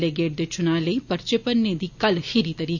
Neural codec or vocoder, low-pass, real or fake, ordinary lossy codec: none; 7.2 kHz; real; none